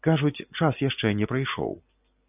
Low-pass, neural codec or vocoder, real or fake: 3.6 kHz; none; real